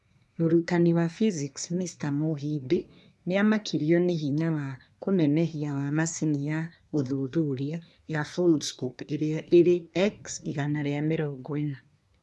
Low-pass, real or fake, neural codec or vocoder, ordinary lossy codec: none; fake; codec, 24 kHz, 1 kbps, SNAC; none